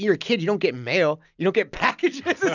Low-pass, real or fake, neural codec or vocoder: 7.2 kHz; real; none